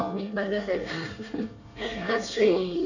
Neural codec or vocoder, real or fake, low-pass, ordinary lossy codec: codec, 24 kHz, 1 kbps, SNAC; fake; 7.2 kHz; none